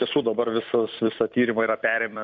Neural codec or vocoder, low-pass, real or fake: vocoder, 44.1 kHz, 128 mel bands every 256 samples, BigVGAN v2; 7.2 kHz; fake